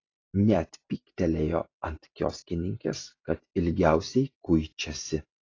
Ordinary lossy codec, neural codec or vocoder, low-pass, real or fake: AAC, 32 kbps; vocoder, 44.1 kHz, 128 mel bands every 256 samples, BigVGAN v2; 7.2 kHz; fake